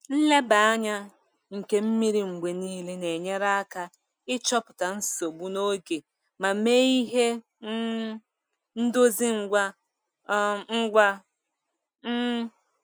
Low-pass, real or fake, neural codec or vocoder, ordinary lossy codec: none; real; none; none